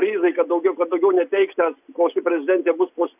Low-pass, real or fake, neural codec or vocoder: 3.6 kHz; real; none